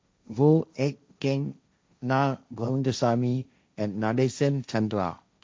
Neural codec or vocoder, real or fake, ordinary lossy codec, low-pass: codec, 16 kHz, 1.1 kbps, Voila-Tokenizer; fake; none; none